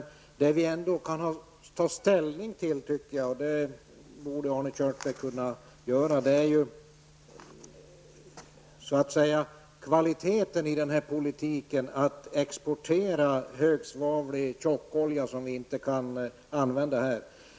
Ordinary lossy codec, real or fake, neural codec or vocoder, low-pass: none; real; none; none